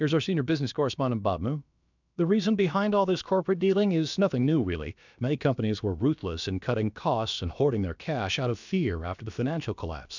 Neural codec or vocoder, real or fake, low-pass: codec, 16 kHz, about 1 kbps, DyCAST, with the encoder's durations; fake; 7.2 kHz